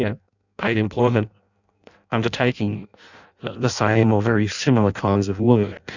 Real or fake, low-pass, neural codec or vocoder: fake; 7.2 kHz; codec, 16 kHz in and 24 kHz out, 0.6 kbps, FireRedTTS-2 codec